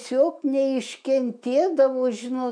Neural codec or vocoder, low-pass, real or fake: autoencoder, 48 kHz, 128 numbers a frame, DAC-VAE, trained on Japanese speech; 9.9 kHz; fake